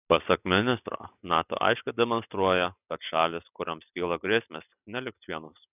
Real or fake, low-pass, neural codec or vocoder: real; 3.6 kHz; none